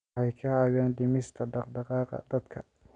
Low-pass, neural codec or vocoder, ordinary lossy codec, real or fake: 9.9 kHz; none; none; real